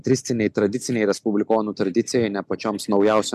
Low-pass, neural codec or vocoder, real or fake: 14.4 kHz; none; real